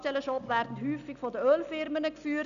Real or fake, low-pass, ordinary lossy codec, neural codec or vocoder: real; 7.2 kHz; none; none